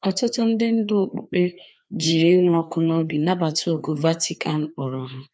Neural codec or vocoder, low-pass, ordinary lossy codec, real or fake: codec, 16 kHz, 2 kbps, FreqCodec, larger model; none; none; fake